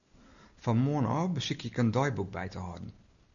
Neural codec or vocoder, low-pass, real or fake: none; 7.2 kHz; real